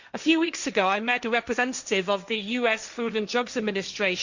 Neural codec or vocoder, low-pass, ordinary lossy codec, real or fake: codec, 16 kHz, 1.1 kbps, Voila-Tokenizer; 7.2 kHz; Opus, 64 kbps; fake